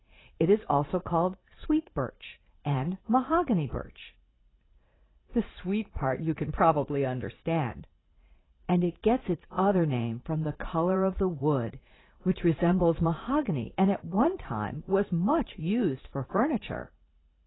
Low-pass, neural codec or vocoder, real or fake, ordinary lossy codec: 7.2 kHz; vocoder, 44.1 kHz, 80 mel bands, Vocos; fake; AAC, 16 kbps